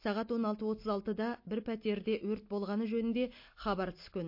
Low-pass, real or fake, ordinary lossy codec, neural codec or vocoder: 5.4 kHz; real; MP3, 32 kbps; none